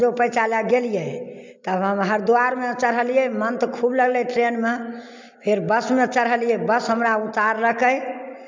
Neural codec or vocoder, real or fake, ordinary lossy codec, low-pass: none; real; MP3, 64 kbps; 7.2 kHz